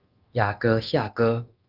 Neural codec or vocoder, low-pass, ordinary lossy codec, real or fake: codec, 24 kHz, 0.9 kbps, DualCodec; 5.4 kHz; Opus, 32 kbps; fake